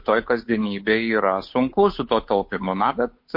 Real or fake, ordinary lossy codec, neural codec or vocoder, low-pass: fake; MP3, 32 kbps; codec, 16 kHz, 8 kbps, FunCodec, trained on Chinese and English, 25 frames a second; 5.4 kHz